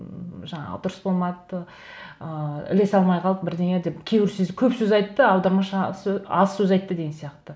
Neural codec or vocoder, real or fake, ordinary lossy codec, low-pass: none; real; none; none